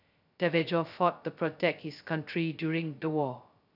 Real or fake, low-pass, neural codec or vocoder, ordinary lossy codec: fake; 5.4 kHz; codec, 16 kHz, 0.2 kbps, FocalCodec; none